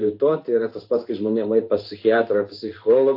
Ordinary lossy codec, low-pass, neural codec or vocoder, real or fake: AAC, 32 kbps; 5.4 kHz; codec, 16 kHz in and 24 kHz out, 1 kbps, XY-Tokenizer; fake